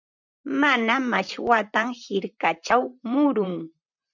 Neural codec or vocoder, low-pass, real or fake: vocoder, 44.1 kHz, 128 mel bands, Pupu-Vocoder; 7.2 kHz; fake